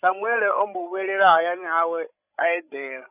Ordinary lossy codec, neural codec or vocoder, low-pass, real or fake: none; codec, 16 kHz, 16 kbps, FreqCodec, larger model; 3.6 kHz; fake